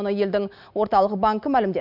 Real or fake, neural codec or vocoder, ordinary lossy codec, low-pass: real; none; none; 5.4 kHz